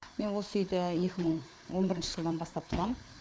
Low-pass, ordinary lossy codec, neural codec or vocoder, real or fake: none; none; codec, 16 kHz, 4 kbps, FreqCodec, larger model; fake